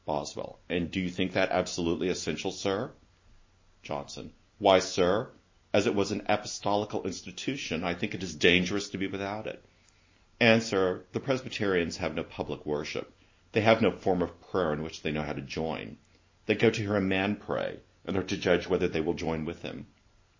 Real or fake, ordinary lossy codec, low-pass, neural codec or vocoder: real; MP3, 32 kbps; 7.2 kHz; none